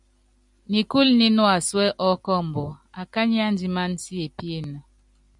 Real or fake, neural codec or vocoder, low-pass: real; none; 10.8 kHz